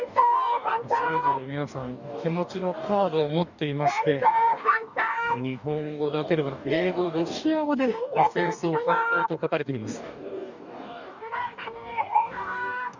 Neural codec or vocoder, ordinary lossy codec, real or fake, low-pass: codec, 44.1 kHz, 2.6 kbps, DAC; none; fake; 7.2 kHz